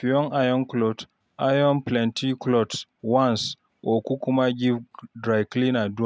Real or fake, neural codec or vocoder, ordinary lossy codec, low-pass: real; none; none; none